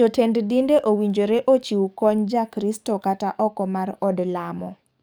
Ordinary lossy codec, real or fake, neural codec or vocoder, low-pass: none; fake; codec, 44.1 kHz, 7.8 kbps, DAC; none